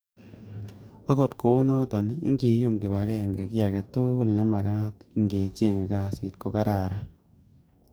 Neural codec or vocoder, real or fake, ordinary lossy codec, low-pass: codec, 44.1 kHz, 2.6 kbps, DAC; fake; none; none